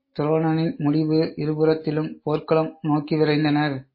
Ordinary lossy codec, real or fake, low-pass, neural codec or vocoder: MP3, 24 kbps; real; 5.4 kHz; none